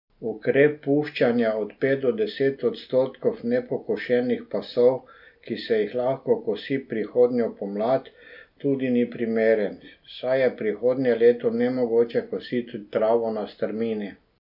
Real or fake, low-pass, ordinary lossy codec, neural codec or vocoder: real; 5.4 kHz; none; none